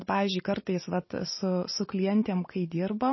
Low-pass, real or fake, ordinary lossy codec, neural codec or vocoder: 7.2 kHz; real; MP3, 24 kbps; none